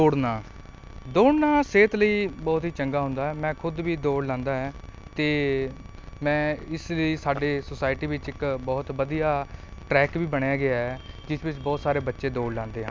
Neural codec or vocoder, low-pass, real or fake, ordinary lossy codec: none; none; real; none